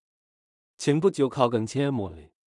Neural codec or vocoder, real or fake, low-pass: codec, 16 kHz in and 24 kHz out, 0.4 kbps, LongCat-Audio-Codec, two codebook decoder; fake; 10.8 kHz